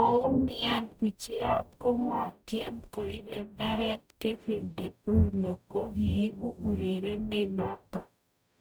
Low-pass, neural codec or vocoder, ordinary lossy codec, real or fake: none; codec, 44.1 kHz, 0.9 kbps, DAC; none; fake